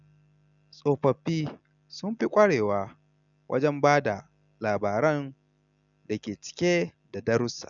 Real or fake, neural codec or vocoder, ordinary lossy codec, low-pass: real; none; none; 7.2 kHz